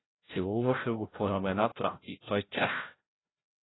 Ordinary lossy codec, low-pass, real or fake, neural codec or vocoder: AAC, 16 kbps; 7.2 kHz; fake; codec, 16 kHz, 0.5 kbps, FreqCodec, larger model